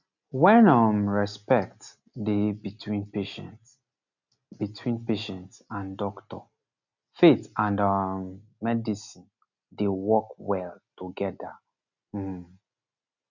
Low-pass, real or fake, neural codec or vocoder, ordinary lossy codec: 7.2 kHz; real; none; none